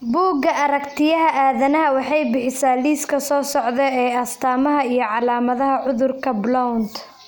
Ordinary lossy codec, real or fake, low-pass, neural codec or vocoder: none; real; none; none